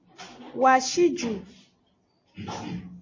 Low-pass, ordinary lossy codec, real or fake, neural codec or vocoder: 7.2 kHz; MP3, 32 kbps; real; none